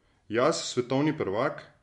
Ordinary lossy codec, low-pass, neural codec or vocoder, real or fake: MP3, 64 kbps; 10.8 kHz; none; real